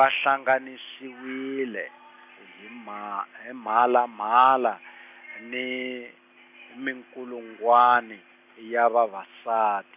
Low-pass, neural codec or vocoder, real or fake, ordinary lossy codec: 3.6 kHz; none; real; AAC, 32 kbps